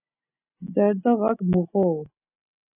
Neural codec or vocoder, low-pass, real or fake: none; 3.6 kHz; real